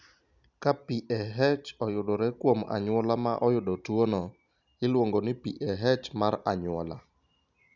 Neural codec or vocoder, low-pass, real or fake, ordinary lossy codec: none; 7.2 kHz; real; none